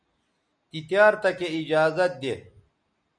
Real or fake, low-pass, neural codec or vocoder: real; 9.9 kHz; none